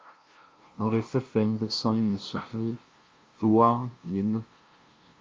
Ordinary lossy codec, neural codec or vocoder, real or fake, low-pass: Opus, 24 kbps; codec, 16 kHz, 0.5 kbps, FunCodec, trained on LibriTTS, 25 frames a second; fake; 7.2 kHz